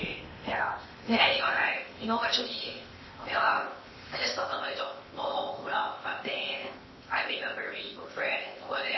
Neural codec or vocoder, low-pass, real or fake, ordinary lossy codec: codec, 16 kHz in and 24 kHz out, 0.8 kbps, FocalCodec, streaming, 65536 codes; 7.2 kHz; fake; MP3, 24 kbps